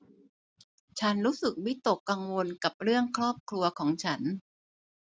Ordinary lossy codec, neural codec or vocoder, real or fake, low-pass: none; none; real; none